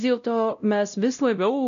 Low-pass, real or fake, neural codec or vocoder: 7.2 kHz; fake; codec, 16 kHz, 0.5 kbps, X-Codec, WavLM features, trained on Multilingual LibriSpeech